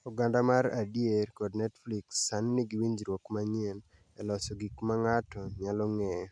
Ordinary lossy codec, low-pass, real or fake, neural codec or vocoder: none; 9.9 kHz; real; none